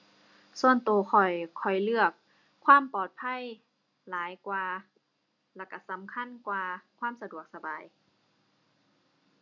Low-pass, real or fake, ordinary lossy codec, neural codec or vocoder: 7.2 kHz; real; none; none